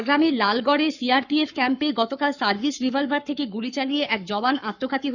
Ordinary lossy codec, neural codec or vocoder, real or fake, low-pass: none; codec, 44.1 kHz, 7.8 kbps, Pupu-Codec; fake; 7.2 kHz